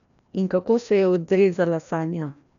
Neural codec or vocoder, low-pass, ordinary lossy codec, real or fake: codec, 16 kHz, 1 kbps, FreqCodec, larger model; 7.2 kHz; none; fake